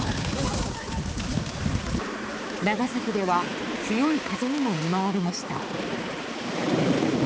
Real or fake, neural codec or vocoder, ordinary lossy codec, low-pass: fake; codec, 16 kHz, 4 kbps, X-Codec, HuBERT features, trained on balanced general audio; none; none